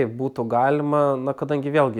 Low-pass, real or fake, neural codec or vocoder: 19.8 kHz; real; none